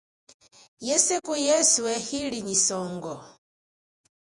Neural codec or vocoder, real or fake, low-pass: vocoder, 48 kHz, 128 mel bands, Vocos; fake; 10.8 kHz